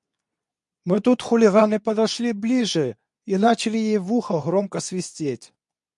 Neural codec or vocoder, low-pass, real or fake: codec, 24 kHz, 0.9 kbps, WavTokenizer, medium speech release version 2; 10.8 kHz; fake